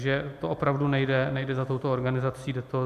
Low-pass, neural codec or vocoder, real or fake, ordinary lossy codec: 14.4 kHz; none; real; AAC, 96 kbps